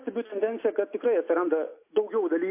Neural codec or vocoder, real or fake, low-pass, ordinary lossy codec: none; real; 3.6 kHz; MP3, 24 kbps